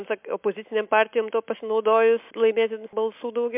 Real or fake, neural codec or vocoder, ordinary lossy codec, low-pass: real; none; MP3, 32 kbps; 3.6 kHz